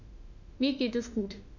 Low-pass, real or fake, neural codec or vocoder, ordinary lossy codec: 7.2 kHz; fake; autoencoder, 48 kHz, 32 numbers a frame, DAC-VAE, trained on Japanese speech; none